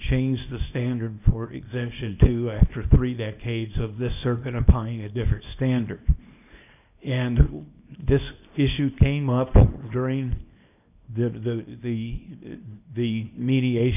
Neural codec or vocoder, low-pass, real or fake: codec, 24 kHz, 0.9 kbps, WavTokenizer, small release; 3.6 kHz; fake